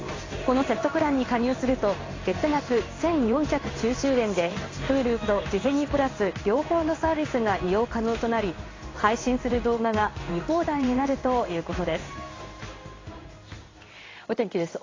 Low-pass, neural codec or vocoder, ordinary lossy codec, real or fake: 7.2 kHz; codec, 16 kHz in and 24 kHz out, 1 kbps, XY-Tokenizer; AAC, 32 kbps; fake